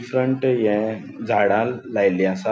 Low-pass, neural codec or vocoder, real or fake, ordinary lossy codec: none; none; real; none